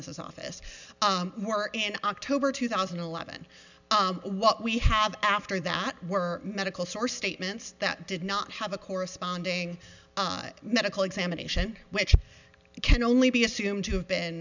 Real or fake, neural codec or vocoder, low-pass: real; none; 7.2 kHz